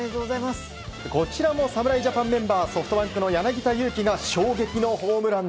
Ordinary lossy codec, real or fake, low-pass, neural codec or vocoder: none; real; none; none